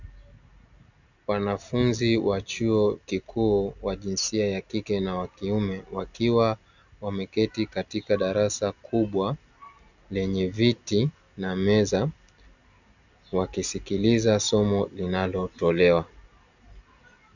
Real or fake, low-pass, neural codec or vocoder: real; 7.2 kHz; none